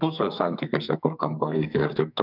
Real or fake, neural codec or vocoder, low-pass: fake; codec, 44.1 kHz, 2.6 kbps, SNAC; 5.4 kHz